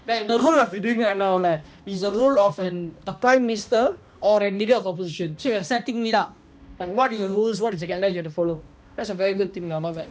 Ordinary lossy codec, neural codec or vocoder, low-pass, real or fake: none; codec, 16 kHz, 1 kbps, X-Codec, HuBERT features, trained on balanced general audio; none; fake